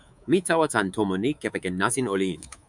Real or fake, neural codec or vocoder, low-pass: fake; codec, 24 kHz, 3.1 kbps, DualCodec; 10.8 kHz